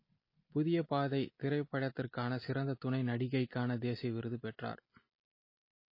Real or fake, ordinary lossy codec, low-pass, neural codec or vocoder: real; MP3, 24 kbps; 5.4 kHz; none